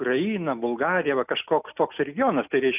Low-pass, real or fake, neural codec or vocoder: 3.6 kHz; real; none